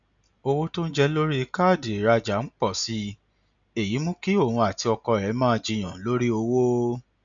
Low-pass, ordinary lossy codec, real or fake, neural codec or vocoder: 7.2 kHz; none; real; none